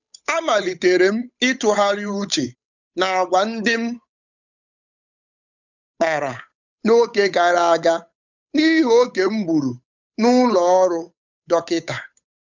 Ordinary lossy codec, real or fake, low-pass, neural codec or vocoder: none; fake; 7.2 kHz; codec, 16 kHz, 8 kbps, FunCodec, trained on Chinese and English, 25 frames a second